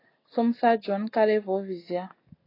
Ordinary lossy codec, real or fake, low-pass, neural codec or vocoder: AAC, 24 kbps; real; 5.4 kHz; none